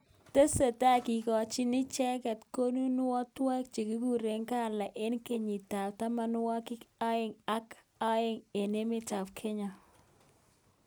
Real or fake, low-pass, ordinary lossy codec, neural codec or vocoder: real; none; none; none